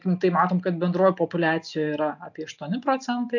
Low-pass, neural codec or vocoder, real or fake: 7.2 kHz; none; real